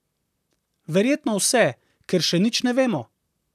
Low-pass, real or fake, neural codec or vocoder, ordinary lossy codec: 14.4 kHz; fake; vocoder, 44.1 kHz, 128 mel bands, Pupu-Vocoder; none